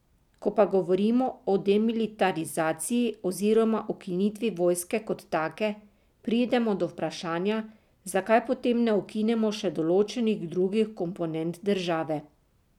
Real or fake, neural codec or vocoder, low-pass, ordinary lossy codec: real; none; 19.8 kHz; none